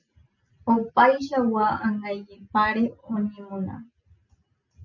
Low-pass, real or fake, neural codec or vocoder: 7.2 kHz; real; none